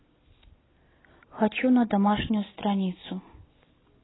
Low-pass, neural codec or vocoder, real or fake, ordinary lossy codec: 7.2 kHz; none; real; AAC, 16 kbps